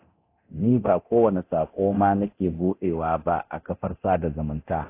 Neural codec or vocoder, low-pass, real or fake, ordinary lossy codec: codec, 24 kHz, 0.9 kbps, DualCodec; 3.6 kHz; fake; AAC, 24 kbps